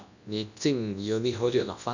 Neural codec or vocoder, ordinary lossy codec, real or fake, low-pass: codec, 24 kHz, 0.9 kbps, WavTokenizer, large speech release; none; fake; 7.2 kHz